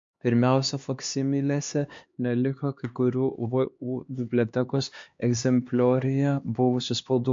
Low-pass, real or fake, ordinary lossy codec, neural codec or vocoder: 7.2 kHz; fake; MP3, 48 kbps; codec, 16 kHz, 2 kbps, X-Codec, HuBERT features, trained on LibriSpeech